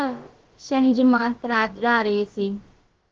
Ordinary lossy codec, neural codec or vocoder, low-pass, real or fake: Opus, 16 kbps; codec, 16 kHz, about 1 kbps, DyCAST, with the encoder's durations; 7.2 kHz; fake